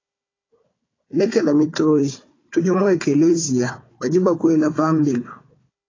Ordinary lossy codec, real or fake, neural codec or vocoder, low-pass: AAC, 32 kbps; fake; codec, 16 kHz, 4 kbps, FunCodec, trained on Chinese and English, 50 frames a second; 7.2 kHz